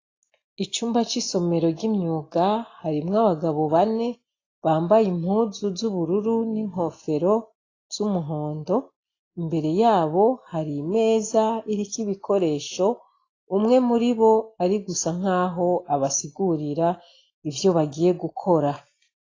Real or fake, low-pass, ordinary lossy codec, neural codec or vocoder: real; 7.2 kHz; AAC, 32 kbps; none